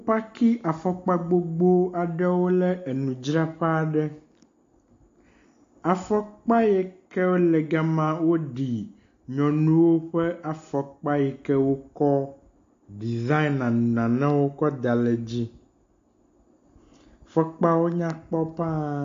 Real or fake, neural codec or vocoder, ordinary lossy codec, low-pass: real; none; AAC, 48 kbps; 7.2 kHz